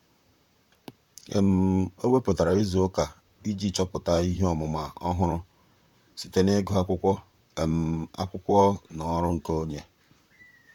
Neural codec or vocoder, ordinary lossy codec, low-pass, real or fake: vocoder, 44.1 kHz, 128 mel bands, Pupu-Vocoder; none; 19.8 kHz; fake